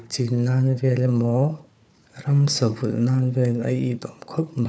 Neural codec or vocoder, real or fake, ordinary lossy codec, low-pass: codec, 16 kHz, 4 kbps, FunCodec, trained on Chinese and English, 50 frames a second; fake; none; none